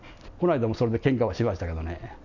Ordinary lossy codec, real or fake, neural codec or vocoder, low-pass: none; real; none; 7.2 kHz